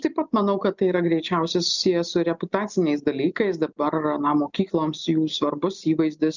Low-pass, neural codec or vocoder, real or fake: 7.2 kHz; none; real